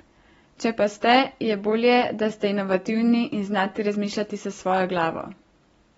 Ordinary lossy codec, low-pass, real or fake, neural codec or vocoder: AAC, 24 kbps; 19.8 kHz; real; none